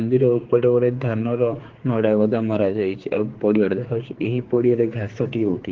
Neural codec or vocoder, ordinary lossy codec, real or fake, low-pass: codec, 16 kHz, 4 kbps, X-Codec, HuBERT features, trained on general audio; Opus, 16 kbps; fake; 7.2 kHz